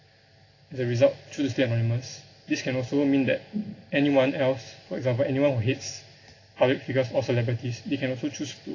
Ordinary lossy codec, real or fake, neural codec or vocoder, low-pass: AAC, 32 kbps; real; none; 7.2 kHz